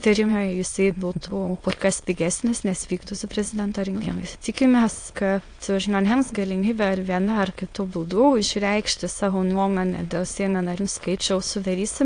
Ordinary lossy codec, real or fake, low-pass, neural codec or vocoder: AAC, 48 kbps; fake; 9.9 kHz; autoencoder, 22.05 kHz, a latent of 192 numbers a frame, VITS, trained on many speakers